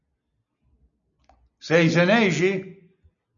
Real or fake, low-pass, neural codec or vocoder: real; 7.2 kHz; none